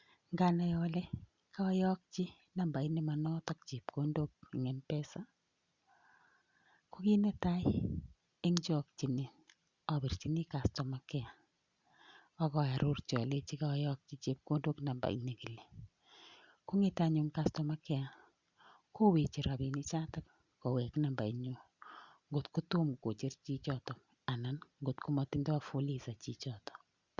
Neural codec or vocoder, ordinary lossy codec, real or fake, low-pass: none; Opus, 64 kbps; real; 7.2 kHz